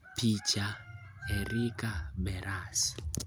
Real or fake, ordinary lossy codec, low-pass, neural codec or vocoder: real; none; none; none